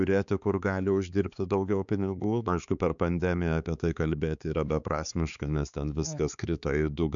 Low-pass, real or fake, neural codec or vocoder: 7.2 kHz; fake; codec, 16 kHz, 4 kbps, X-Codec, HuBERT features, trained on balanced general audio